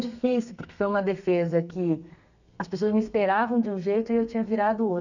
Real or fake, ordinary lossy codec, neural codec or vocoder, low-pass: fake; none; codec, 32 kHz, 1.9 kbps, SNAC; 7.2 kHz